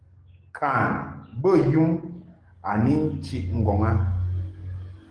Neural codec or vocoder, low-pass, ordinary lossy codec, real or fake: codec, 44.1 kHz, 7.8 kbps, DAC; 9.9 kHz; Opus, 24 kbps; fake